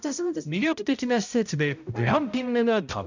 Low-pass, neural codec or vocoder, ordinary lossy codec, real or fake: 7.2 kHz; codec, 16 kHz, 0.5 kbps, X-Codec, HuBERT features, trained on balanced general audio; none; fake